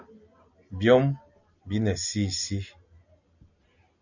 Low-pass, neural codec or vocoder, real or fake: 7.2 kHz; none; real